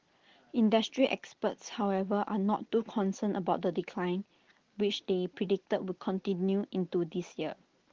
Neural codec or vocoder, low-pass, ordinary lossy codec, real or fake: none; 7.2 kHz; Opus, 16 kbps; real